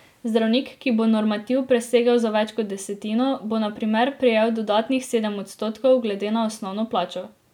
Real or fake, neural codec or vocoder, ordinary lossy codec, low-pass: real; none; none; 19.8 kHz